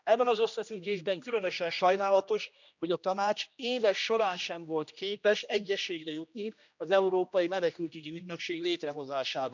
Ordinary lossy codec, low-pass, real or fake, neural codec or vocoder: none; 7.2 kHz; fake; codec, 16 kHz, 1 kbps, X-Codec, HuBERT features, trained on general audio